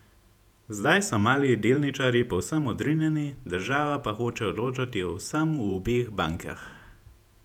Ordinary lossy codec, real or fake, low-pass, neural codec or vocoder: none; fake; 19.8 kHz; vocoder, 48 kHz, 128 mel bands, Vocos